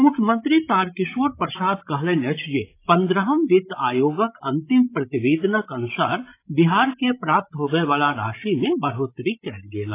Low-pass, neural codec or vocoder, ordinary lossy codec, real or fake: 3.6 kHz; codec, 16 kHz, 16 kbps, FreqCodec, larger model; AAC, 24 kbps; fake